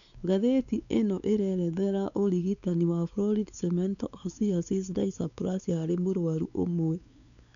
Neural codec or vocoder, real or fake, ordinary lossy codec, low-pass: codec, 16 kHz, 4 kbps, X-Codec, WavLM features, trained on Multilingual LibriSpeech; fake; none; 7.2 kHz